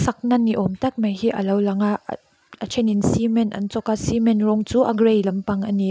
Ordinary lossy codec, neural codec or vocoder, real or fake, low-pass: none; none; real; none